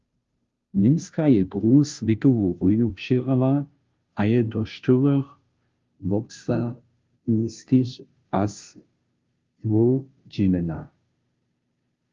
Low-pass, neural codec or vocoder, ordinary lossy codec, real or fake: 7.2 kHz; codec, 16 kHz, 0.5 kbps, FunCodec, trained on Chinese and English, 25 frames a second; Opus, 32 kbps; fake